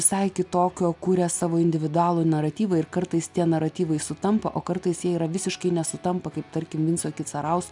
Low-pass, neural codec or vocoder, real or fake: 10.8 kHz; none; real